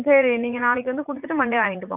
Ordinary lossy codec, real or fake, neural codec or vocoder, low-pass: none; fake; vocoder, 44.1 kHz, 80 mel bands, Vocos; 3.6 kHz